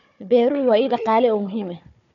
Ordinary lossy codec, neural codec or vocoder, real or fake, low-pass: none; codec, 16 kHz, 4 kbps, FunCodec, trained on Chinese and English, 50 frames a second; fake; 7.2 kHz